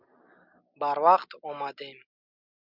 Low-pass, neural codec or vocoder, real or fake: 5.4 kHz; none; real